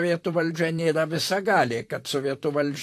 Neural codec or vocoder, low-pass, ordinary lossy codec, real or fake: vocoder, 44.1 kHz, 128 mel bands, Pupu-Vocoder; 14.4 kHz; AAC, 64 kbps; fake